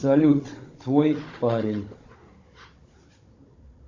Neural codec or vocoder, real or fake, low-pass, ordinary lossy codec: codec, 16 kHz, 16 kbps, FunCodec, trained on Chinese and English, 50 frames a second; fake; 7.2 kHz; MP3, 48 kbps